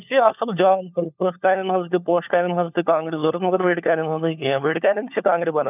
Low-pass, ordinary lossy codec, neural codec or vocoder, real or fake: 3.6 kHz; none; codec, 16 kHz, 4 kbps, FunCodec, trained on LibriTTS, 50 frames a second; fake